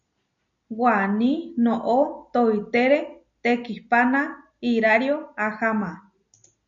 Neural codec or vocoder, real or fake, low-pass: none; real; 7.2 kHz